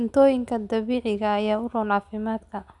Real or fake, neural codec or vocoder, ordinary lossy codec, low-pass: real; none; none; 10.8 kHz